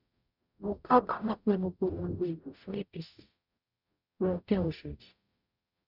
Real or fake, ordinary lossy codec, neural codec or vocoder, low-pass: fake; none; codec, 44.1 kHz, 0.9 kbps, DAC; 5.4 kHz